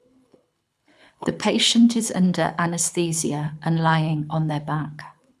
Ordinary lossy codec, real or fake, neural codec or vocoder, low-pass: none; fake; codec, 24 kHz, 6 kbps, HILCodec; none